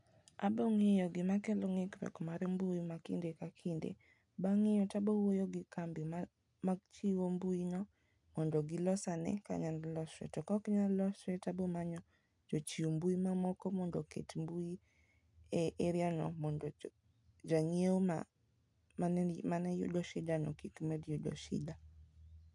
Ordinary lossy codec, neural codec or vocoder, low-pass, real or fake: none; none; 10.8 kHz; real